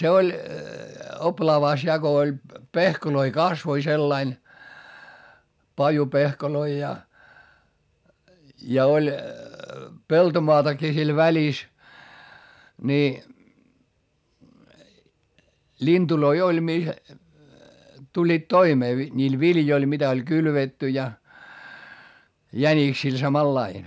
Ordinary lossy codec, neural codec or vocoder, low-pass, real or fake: none; none; none; real